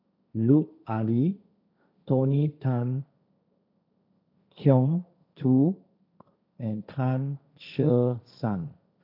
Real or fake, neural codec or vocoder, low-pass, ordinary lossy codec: fake; codec, 16 kHz, 1.1 kbps, Voila-Tokenizer; 5.4 kHz; none